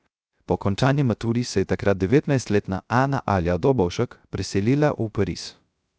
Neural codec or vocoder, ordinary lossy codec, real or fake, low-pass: codec, 16 kHz, 0.3 kbps, FocalCodec; none; fake; none